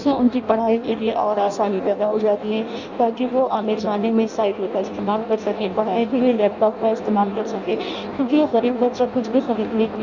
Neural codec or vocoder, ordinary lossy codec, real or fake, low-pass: codec, 16 kHz in and 24 kHz out, 0.6 kbps, FireRedTTS-2 codec; Opus, 64 kbps; fake; 7.2 kHz